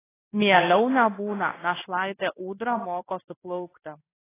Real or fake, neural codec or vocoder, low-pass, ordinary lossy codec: fake; codec, 16 kHz in and 24 kHz out, 1 kbps, XY-Tokenizer; 3.6 kHz; AAC, 16 kbps